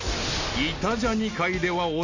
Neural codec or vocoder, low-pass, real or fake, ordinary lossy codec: none; 7.2 kHz; real; none